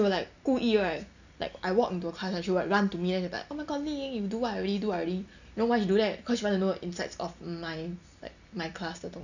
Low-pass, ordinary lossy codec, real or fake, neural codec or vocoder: 7.2 kHz; none; real; none